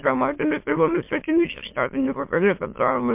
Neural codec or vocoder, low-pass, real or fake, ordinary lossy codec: autoencoder, 22.05 kHz, a latent of 192 numbers a frame, VITS, trained on many speakers; 3.6 kHz; fake; MP3, 32 kbps